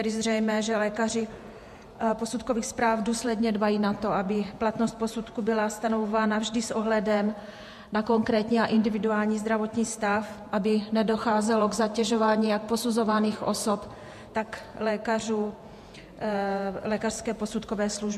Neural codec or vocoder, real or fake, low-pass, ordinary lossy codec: vocoder, 48 kHz, 128 mel bands, Vocos; fake; 14.4 kHz; MP3, 64 kbps